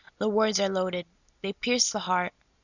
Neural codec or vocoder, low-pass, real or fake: none; 7.2 kHz; real